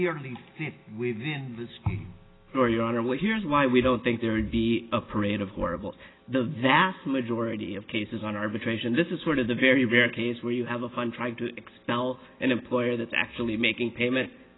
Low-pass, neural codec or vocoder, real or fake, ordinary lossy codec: 7.2 kHz; none; real; AAC, 16 kbps